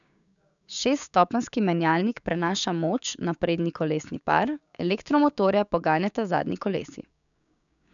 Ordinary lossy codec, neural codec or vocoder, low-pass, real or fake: none; codec, 16 kHz, 6 kbps, DAC; 7.2 kHz; fake